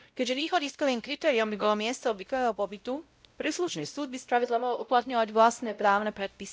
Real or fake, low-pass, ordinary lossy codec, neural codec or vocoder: fake; none; none; codec, 16 kHz, 0.5 kbps, X-Codec, WavLM features, trained on Multilingual LibriSpeech